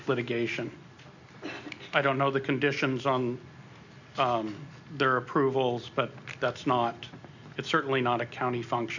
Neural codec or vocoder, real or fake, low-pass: none; real; 7.2 kHz